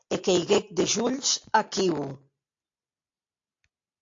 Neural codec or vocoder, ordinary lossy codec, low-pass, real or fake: none; AAC, 48 kbps; 7.2 kHz; real